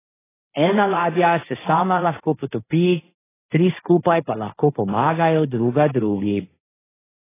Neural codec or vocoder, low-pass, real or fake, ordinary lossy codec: codec, 16 kHz, 1.1 kbps, Voila-Tokenizer; 3.6 kHz; fake; AAC, 16 kbps